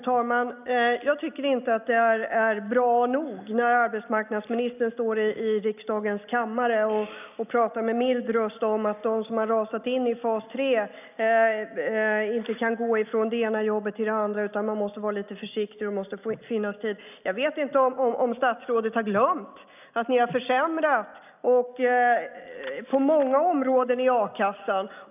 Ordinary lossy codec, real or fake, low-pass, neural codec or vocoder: none; real; 3.6 kHz; none